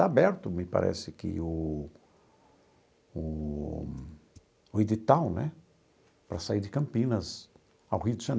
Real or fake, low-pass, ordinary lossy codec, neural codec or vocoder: real; none; none; none